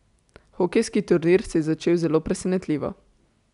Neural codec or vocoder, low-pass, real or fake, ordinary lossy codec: none; 10.8 kHz; real; MP3, 96 kbps